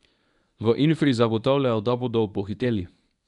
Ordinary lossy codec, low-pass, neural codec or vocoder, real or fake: none; 10.8 kHz; codec, 24 kHz, 0.9 kbps, WavTokenizer, medium speech release version 1; fake